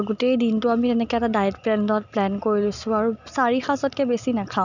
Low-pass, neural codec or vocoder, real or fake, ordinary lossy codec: 7.2 kHz; none; real; none